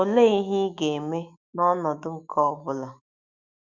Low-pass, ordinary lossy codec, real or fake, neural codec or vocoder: 7.2 kHz; Opus, 64 kbps; fake; autoencoder, 48 kHz, 128 numbers a frame, DAC-VAE, trained on Japanese speech